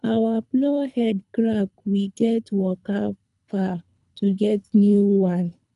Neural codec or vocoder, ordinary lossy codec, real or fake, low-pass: codec, 24 kHz, 3 kbps, HILCodec; none; fake; 10.8 kHz